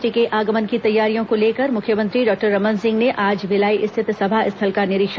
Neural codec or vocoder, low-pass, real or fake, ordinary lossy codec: none; none; real; none